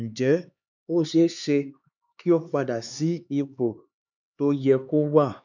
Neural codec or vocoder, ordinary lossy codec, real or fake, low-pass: codec, 16 kHz, 4 kbps, X-Codec, HuBERT features, trained on LibriSpeech; none; fake; 7.2 kHz